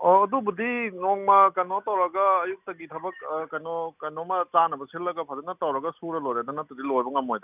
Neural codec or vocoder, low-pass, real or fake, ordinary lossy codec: none; 3.6 kHz; real; none